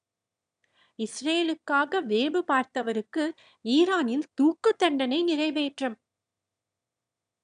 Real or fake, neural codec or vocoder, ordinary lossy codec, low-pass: fake; autoencoder, 22.05 kHz, a latent of 192 numbers a frame, VITS, trained on one speaker; none; 9.9 kHz